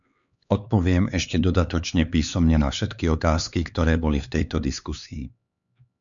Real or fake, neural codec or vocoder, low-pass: fake; codec, 16 kHz, 4 kbps, X-Codec, HuBERT features, trained on LibriSpeech; 7.2 kHz